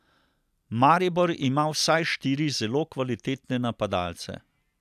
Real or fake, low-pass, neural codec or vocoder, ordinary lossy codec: real; 14.4 kHz; none; none